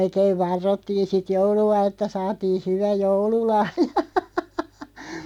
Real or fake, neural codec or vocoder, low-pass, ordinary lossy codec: real; none; 19.8 kHz; none